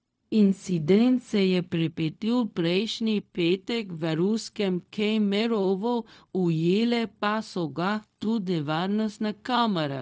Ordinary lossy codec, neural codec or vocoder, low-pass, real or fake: none; codec, 16 kHz, 0.4 kbps, LongCat-Audio-Codec; none; fake